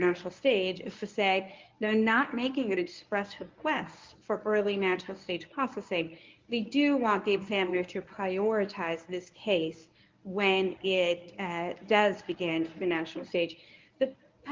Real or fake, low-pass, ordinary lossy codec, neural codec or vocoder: fake; 7.2 kHz; Opus, 24 kbps; codec, 24 kHz, 0.9 kbps, WavTokenizer, medium speech release version 1